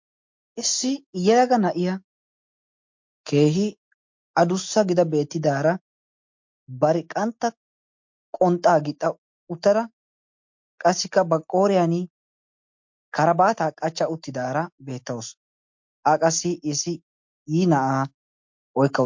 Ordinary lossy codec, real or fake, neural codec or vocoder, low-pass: MP3, 48 kbps; real; none; 7.2 kHz